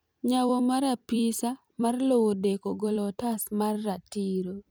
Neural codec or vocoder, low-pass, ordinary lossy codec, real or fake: vocoder, 44.1 kHz, 128 mel bands every 256 samples, BigVGAN v2; none; none; fake